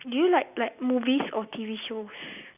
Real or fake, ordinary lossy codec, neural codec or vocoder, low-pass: real; none; none; 3.6 kHz